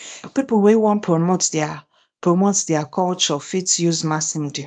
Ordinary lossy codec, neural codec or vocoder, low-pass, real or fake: none; codec, 24 kHz, 0.9 kbps, WavTokenizer, small release; 9.9 kHz; fake